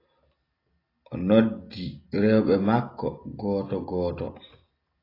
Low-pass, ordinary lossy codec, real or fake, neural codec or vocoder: 5.4 kHz; AAC, 24 kbps; real; none